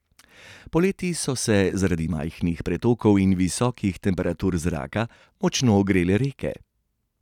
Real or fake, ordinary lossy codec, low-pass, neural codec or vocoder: real; none; 19.8 kHz; none